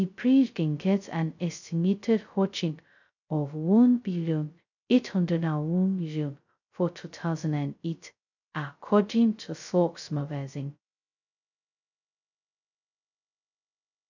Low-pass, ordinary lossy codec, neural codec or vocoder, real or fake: 7.2 kHz; none; codec, 16 kHz, 0.2 kbps, FocalCodec; fake